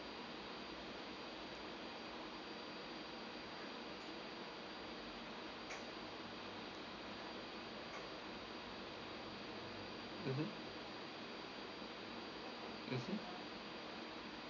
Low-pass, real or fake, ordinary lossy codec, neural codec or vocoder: 7.2 kHz; real; none; none